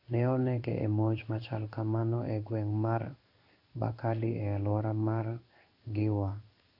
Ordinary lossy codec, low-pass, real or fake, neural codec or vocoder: AAC, 48 kbps; 5.4 kHz; fake; codec, 16 kHz in and 24 kHz out, 1 kbps, XY-Tokenizer